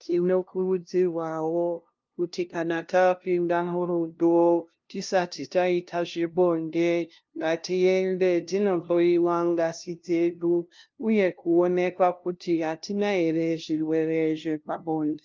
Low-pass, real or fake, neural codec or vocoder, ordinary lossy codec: 7.2 kHz; fake; codec, 16 kHz, 0.5 kbps, FunCodec, trained on LibriTTS, 25 frames a second; Opus, 24 kbps